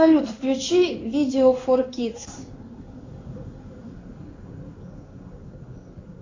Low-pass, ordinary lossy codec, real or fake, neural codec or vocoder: 7.2 kHz; AAC, 32 kbps; fake; codec, 16 kHz in and 24 kHz out, 1 kbps, XY-Tokenizer